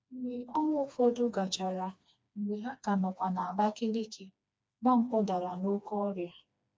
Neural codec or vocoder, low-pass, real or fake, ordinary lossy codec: codec, 16 kHz, 2 kbps, FreqCodec, smaller model; none; fake; none